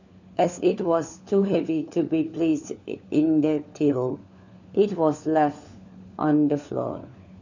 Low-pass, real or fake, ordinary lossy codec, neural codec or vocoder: 7.2 kHz; fake; none; codec, 16 kHz, 4 kbps, FunCodec, trained on LibriTTS, 50 frames a second